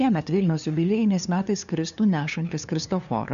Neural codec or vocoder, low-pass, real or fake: codec, 16 kHz, 2 kbps, FunCodec, trained on LibriTTS, 25 frames a second; 7.2 kHz; fake